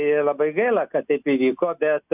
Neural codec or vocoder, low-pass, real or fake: none; 3.6 kHz; real